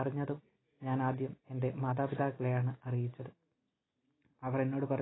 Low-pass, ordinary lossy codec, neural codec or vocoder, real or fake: 7.2 kHz; AAC, 16 kbps; none; real